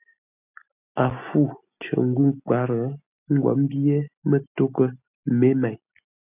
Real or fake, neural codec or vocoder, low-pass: real; none; 3.6 kHz